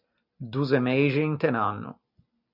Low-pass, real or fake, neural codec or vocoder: 5.4 kHz; real; none